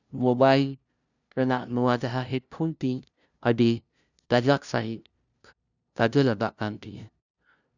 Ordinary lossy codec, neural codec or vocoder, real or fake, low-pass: none; codec, 16 kHz, 0.5 kbps, FunCodec, trained on LibriTTS, 25 frames a second; fake; 7.2 kHz